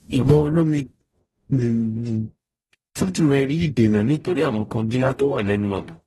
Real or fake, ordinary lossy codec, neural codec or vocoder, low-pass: fake; AAC, 32 kbps; codec, 44.1 kHz, 0.9 kbps, DAC; 19.8 kHz